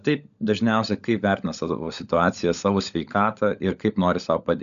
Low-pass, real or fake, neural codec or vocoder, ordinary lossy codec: 7.2 kHz; fake; codec, 16 kHz, 16 kbps, FunCodec, trained on LibriTTS, 50 frames a second; MP3, 96 kbps